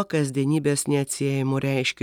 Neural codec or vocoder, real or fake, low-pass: none; real; 19.8 kHz